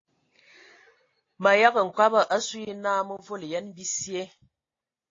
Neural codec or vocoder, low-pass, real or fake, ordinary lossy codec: none; 7.2 kHz; real; AAC, 32 kbps